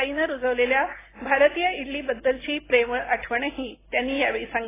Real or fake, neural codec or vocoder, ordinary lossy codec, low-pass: real; none; AAC, 16 kbps; 3.6 kHz